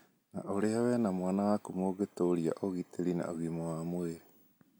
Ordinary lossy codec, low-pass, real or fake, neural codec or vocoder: none; none; real; none